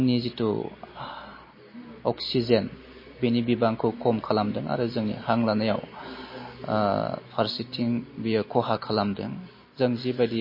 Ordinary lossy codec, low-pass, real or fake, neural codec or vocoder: MP3, 24 kbps; 5.4 kHz; real; none